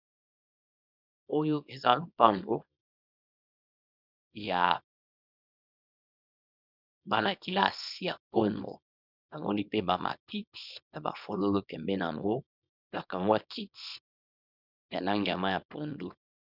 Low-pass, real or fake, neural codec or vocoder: 5.4 kHz; fake; codec, 24 kHz, 0.9 kbps, WavTokenizer, small release